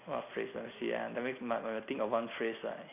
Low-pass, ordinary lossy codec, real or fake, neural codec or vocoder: 3.6 kHz; none; real; none